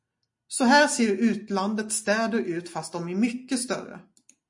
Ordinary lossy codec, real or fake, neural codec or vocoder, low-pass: MP3, 48 kbps; real; none; 10.8 kHz